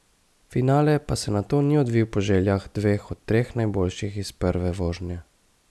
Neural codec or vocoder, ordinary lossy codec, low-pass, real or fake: none; none; none; real